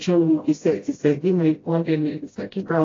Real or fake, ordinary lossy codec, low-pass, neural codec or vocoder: fake; AAC, 32 kbps; 7.2 kHz; codec, 16 kHz, 0.5 kbps, FreqCodec, smaller model